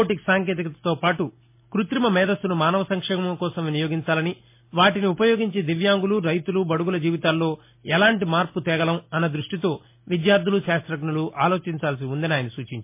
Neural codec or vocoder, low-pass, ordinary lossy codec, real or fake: none; 3.6 kHz; MP3, 24 kbps; real